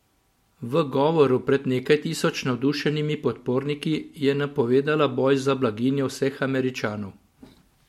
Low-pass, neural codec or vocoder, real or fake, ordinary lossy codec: 19.8 kHz; none; real; MP3, 64 kbps